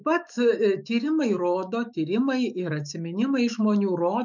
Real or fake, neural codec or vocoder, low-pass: fake; autoencoder, 48 kHz, 128 numbers a frame, DAC-VAE, trained on Japanese speech; 7.2 kHz